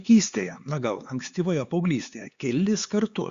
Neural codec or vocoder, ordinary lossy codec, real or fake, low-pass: codec, 16 kHz, 4 kbps, X-Codec, HuBERT features, trained on LibriSpeech; Opus, 64 kbps; fake; 7.2 kHz